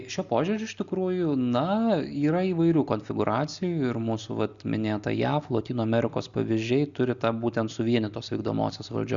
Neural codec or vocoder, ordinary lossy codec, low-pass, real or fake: none; Opus, 64 kbps; 7.2 kHz; real